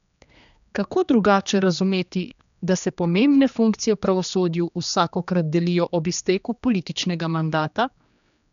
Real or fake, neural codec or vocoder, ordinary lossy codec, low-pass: fake; codec, 16 kHz, 2 kbps, X-Codec, HuBERT features, trained on general audio; none; 7.2 kHz